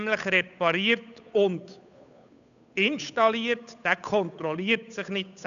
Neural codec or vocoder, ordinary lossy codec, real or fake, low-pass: codec, 16 kHz, 8 kbps, FunCodec, trained on Chinese and English, 25 frames a second; none; fake; 7.2 kHz